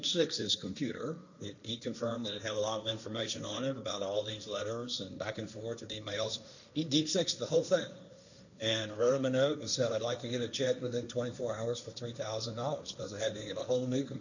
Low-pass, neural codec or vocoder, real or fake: 7.2 kHz; codec, 16 kHz, 1.1 kbps, Voila-Tokenizer; fake